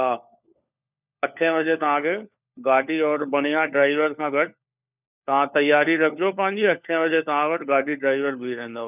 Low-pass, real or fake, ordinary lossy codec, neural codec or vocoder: 3.6 kHz; fake; none; codec, 16 kHz, 4 kbps, FunCodec, trained on LibriTTS, 50 frames a second